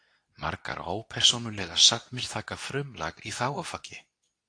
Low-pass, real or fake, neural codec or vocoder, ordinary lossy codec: 9.9 kHz; fake; codec, 24 kHz, 0.9 kbps, WavTokenizer, medium speech release version 2; AAC, 48 kbps